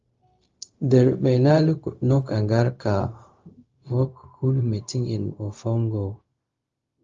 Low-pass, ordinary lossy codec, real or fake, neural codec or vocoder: 7.2 kHz; Opus, 32 kbps; fake; codec, 16 kHz, 0.4 kbps, LongCat-Audio-Codec